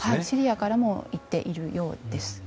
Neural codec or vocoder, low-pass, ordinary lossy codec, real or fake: none; none; none; real